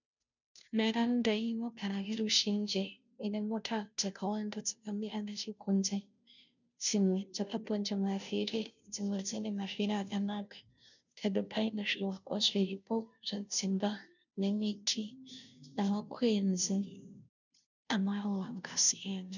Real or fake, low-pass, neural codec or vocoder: fake; 7.2 kHz; codec, 16 kHz, 0.5 kbps, FunCodec, trained on Chinese and English, 25 frames a second